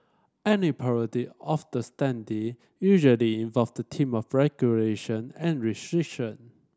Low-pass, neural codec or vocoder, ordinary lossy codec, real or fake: none; none; none; real